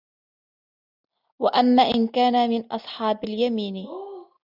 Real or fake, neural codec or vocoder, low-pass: real; none; 5.4 kHz